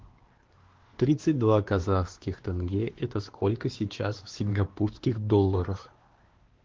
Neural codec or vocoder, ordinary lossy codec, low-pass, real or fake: codec, 16 kHz, 2 kbps, X-Codec, WavLM features, trained on Multilingual LibriSpeech; Opus, 16 kbps; 7.2 kHz; fake